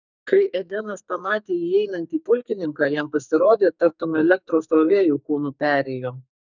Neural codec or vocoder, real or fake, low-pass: codec, 44.1 kHz, 2.6 kbps, SNAC; fake; 7.2 kHz